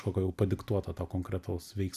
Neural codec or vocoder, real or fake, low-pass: none; real; 14.4 kHz